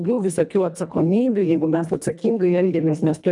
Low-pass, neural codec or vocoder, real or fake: 10.8 kHz; codec, 24 kHz, 1.5 kbps, HILCodec; fake